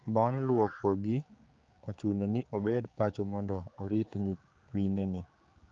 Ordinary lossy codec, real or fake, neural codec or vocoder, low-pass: Opus, 32 kbps; fake; codec, 16 kHz, 4 kbps, X-Codec, HuBERT features, trained on general audio; 7.2 kHz